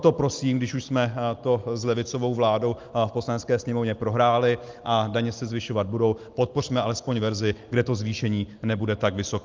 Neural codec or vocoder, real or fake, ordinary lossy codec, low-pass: none; real; Opus, 32 kbps; 7.2 kHz